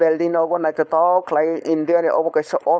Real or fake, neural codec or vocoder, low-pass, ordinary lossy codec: fake; codec, 16 kHz, 4.8 kbps, FACodec; none; none